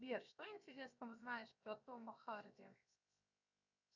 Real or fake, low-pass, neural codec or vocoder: fake; 7.2 kHz; codec, 16 kHz, 0.7 kbps, FocalCodec